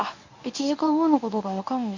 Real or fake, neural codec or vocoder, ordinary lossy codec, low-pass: fake; codec, 24 kHz, 0.9 kbps, WavTokenizer, medium speech release version 1; MP3, 64 kbps; 7.2 kHz